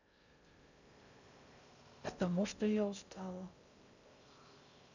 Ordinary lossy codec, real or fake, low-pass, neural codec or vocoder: none; fake; 7.2 kHz; codec, 16 kHz in and 24 kHz out, 0.6 kbps, FocalCodec, streaming, 2048 codes